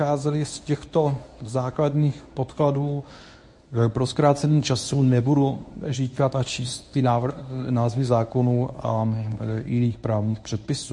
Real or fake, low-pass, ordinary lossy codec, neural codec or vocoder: fake; 10.8 kHz; MP3, 48 kbps; codec, 24 kHz, 0.9 kbps, WavTokenizer, medium speech release version 1